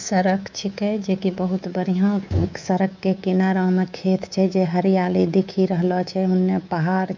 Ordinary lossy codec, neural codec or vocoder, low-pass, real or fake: none; codec, 24 kHz, 3.1 kbps, DualCodec; 7.2 kHz; fake